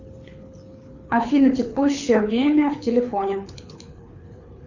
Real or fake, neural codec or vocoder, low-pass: fake; codec, 24 kHz, 6 kbps, HILCodec; 7.2 kHz